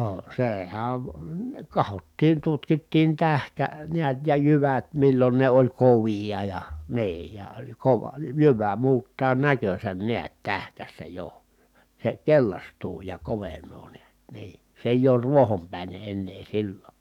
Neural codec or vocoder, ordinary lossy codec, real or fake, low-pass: codec, 44.1 kHz, 7.8 kbps, DAC; none; fake; 19.8 kHz